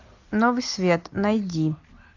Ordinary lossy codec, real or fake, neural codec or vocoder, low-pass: AAC, 48 kbps; real; none; 7.2 kHz